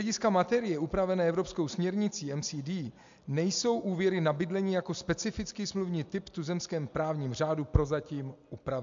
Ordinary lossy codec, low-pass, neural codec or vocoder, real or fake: MP3, 64 kbps; 7.2 kHz; none; real